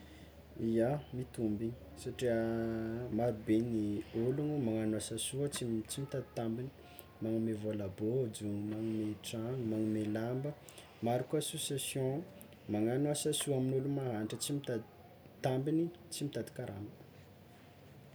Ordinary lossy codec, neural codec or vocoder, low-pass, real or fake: none; none; none; real